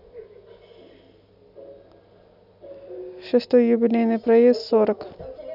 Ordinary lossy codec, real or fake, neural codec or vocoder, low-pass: none; real; none; 5.4 kHz